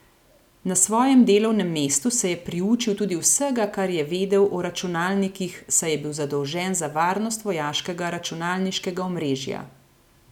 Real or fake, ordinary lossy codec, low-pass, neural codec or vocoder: real; none; 19.8 kHz; none